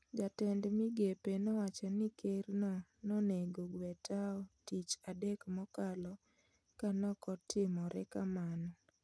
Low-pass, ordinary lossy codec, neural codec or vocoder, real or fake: none; none; none; real